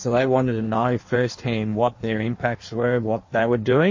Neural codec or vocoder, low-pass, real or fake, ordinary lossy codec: codec, 16 kHz in and 24 kHz out, 1.1 kbps, FireRedTTS-2 codec; 7.2 kHz; fake; MP3, 32 kbps